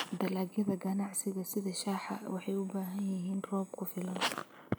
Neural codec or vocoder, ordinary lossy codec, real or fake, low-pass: none; none; real; none